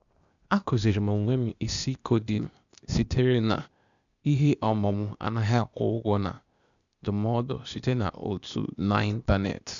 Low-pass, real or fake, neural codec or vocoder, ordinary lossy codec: 7.2 kHz; fake; codec, 16 kHz, 0.8 kbps, ZipCodec; none